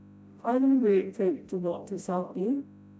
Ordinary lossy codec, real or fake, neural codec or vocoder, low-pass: none; fake; codec, 16 kHz, 0.5 kbps, FreqCodec, smaller model; none